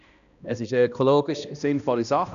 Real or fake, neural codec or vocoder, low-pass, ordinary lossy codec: fake; codec, 16 kHz, 1 kbps, X-Codec, HuBERT features, trained on balanced general audio; 7.2 kHz; none